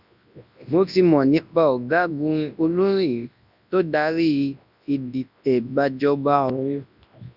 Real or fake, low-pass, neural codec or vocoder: fake; 5.4 kHz; codec, 24 kHz, 0.9 kbps, WavTokenizer, large speech release